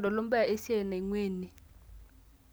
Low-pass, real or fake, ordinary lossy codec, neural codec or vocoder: none; real; none; none